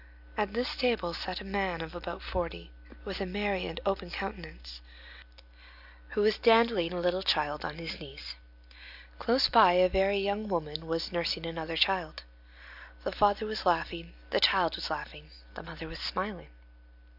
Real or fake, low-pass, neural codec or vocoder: real; 5.4 kHz; none